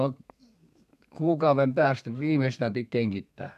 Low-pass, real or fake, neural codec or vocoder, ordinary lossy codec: 14.4 kHz; fake; codec, 32 kHz, 1.9 kbps, SNAC; none